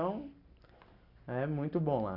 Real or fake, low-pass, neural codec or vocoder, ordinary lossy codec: real; 5.4 kHz; none; none